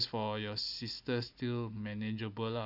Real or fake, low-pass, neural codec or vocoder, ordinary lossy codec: real; 5.4 kHz; none; none